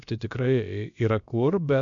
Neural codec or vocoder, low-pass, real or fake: codec, 16 kHz, about 1 kbps, DyCAST, with the encoder's durations; 7.2 kHz; fake